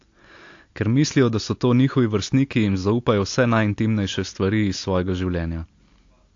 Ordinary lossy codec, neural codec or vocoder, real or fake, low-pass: AAC, 48 kbps; none; real; 7.2 kHz